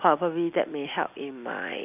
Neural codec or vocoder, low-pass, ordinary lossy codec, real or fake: none; 3.6 kHz; none; real